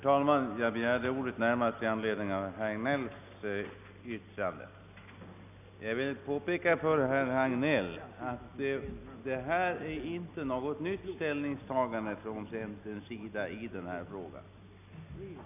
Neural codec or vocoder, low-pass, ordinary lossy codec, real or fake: none; 3.6 kHz; none; real